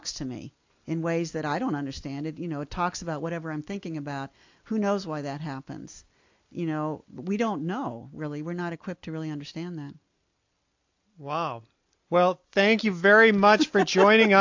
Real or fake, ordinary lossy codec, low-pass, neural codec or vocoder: real; AAC, 48 kbps; 7.2 kHz; none